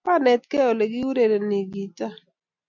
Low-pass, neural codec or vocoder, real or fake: 7.2 kHz; none; real